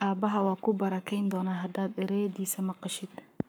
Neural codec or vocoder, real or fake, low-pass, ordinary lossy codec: codec, 44.1 kHz, 7.8 kbps, Pupu-Codec; fake; none; none